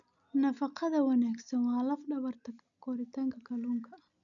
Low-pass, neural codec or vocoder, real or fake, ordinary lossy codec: 7.2 kHz; none; real; none